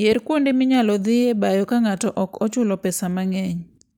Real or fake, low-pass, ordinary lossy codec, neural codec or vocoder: real; 19.8 kHz; none; none